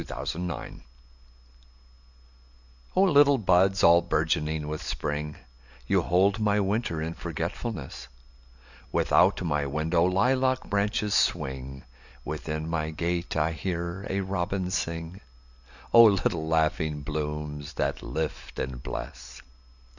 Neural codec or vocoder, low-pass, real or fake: none; 7.2 kHz; real